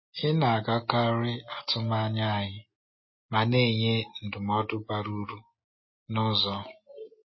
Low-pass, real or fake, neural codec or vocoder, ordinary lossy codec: 7.2 kHz; real; none; MP3, 24 kbps